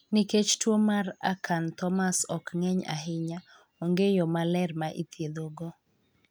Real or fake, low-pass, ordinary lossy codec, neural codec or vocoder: real; none; none; none